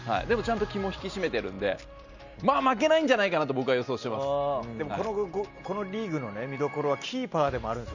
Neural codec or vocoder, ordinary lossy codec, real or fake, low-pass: none; Opus, 64 kbps; real; 7.2 kHz